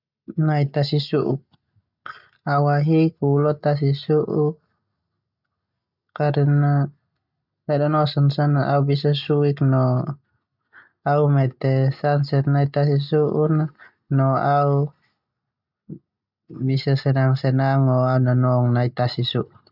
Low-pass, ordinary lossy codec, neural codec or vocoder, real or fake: 5.4 kHz; none; none; real